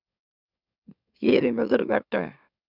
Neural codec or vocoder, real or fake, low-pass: autoencoder, 44.1 kHz, a latent of 192 numbers a frame, MeloTTS; fake; 5.4 kHz